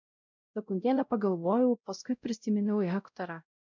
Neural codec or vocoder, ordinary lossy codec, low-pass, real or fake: codec, 16 kHz, 0.5 kbps, X-Codec, WavLM features, trained on Multilingual LibriSpeech; MP3, 64 kbps; 7.2 kHz; fake